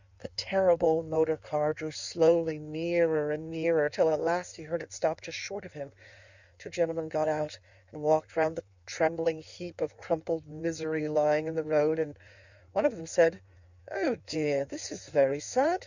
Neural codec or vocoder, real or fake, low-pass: codec, 16 kHz in and 24 kHz out, 1.1 kbps, FireRedTTS-2 codec; fake; 7.2 kHz